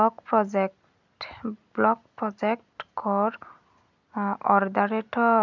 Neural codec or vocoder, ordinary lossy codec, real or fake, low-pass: none; none; real; 7.2 kHz